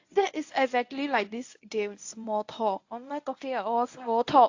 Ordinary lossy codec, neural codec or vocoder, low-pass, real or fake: AAC, 48 kbps; codec, 24 kHz, 0.9 kbps, WavTokenizer, medium speech release version 1; 7.2 kHz; fake